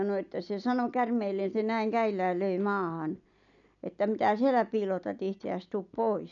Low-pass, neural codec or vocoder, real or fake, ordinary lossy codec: 7.2 kHz; none; real; none